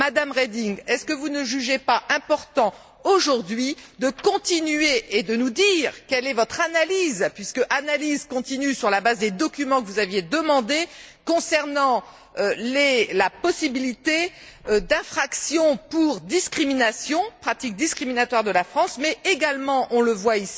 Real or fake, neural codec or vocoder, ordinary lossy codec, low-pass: real; none; none; none